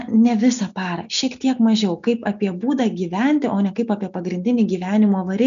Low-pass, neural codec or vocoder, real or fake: 7.2 kHz; none; real